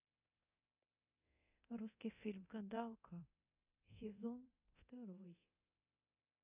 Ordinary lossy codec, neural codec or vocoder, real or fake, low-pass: none; codec, 24 kHz, 0.9 kbps, DualCodec; fake; 3.6 kHz